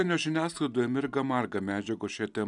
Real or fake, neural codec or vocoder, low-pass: real; none; 10.8 kHz